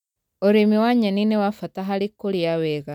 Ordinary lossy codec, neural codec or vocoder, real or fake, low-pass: none; none; real; 19.8 kHz